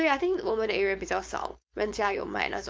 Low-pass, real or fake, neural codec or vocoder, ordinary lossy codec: none; fake; codec, 16 kHz, 4.8 kbps, FACodec; none